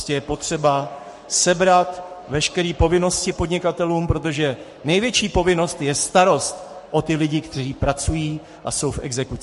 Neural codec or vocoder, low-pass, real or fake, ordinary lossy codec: codec, 44.1 kHz, 7.8 kbps, Pupu-Codec; 14.4 kHz; fake; MP3, 48 kbps